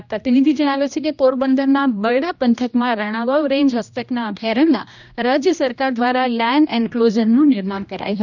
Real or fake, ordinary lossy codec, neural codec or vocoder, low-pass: fake; none; codec, 16 kHz, 1 kbps, X-Codec, HuBERT features, trained on general audio; 7.2 kHz